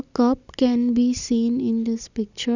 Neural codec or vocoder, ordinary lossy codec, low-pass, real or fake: none; none; 7.2 kHz; real